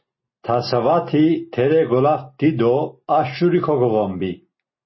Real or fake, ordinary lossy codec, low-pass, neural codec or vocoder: real; MP3, 24 kbps; 7.2 kHz; none